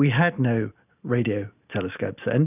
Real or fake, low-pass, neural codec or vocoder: real; 3.6 kHz; none